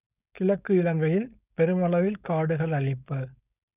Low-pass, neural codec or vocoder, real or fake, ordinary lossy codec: 3.6 kHz; codec, 16 kHz, 4.8 kbps, FACodec; fake; none